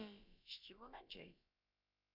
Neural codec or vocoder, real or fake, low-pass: codec, 16 kHz, about 1 kbps, DyCAST, with the encoder's durations; fake; 5.4 kHz